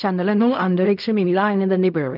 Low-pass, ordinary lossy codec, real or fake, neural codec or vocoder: 5.4 kHz; none; fake; codec, 16 kHz in and 24 kHz out, 0.4 kbps, LongCat-Audio-Codec, fine tuned four codebook decoder